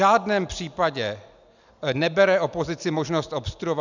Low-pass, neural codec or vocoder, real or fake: 7.2 kHz; none; real